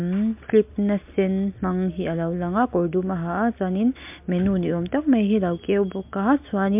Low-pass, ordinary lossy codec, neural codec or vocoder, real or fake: 3.6 kHz; MP3, 32 kbps; none; real